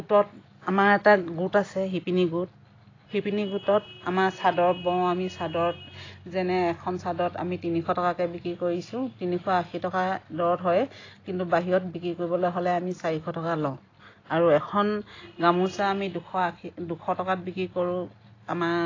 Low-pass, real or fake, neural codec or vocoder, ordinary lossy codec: 7.2 kHz; real; none; AAC, 32 kbps